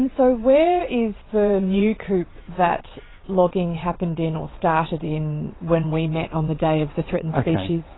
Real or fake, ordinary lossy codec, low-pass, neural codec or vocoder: fake; AAC, 16 kbps; 7.2 kHz; vocoder, 22.05 kHz, 80 mel bands, WaveNeXt